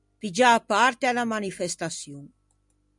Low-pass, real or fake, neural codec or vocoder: 10.8 kHz; real; none